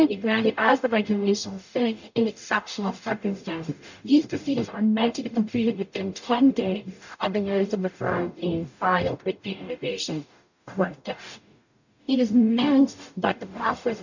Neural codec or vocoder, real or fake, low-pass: codec, 44.1 kHz, 0.9 kbps, DAC; fake; 7.2 kHz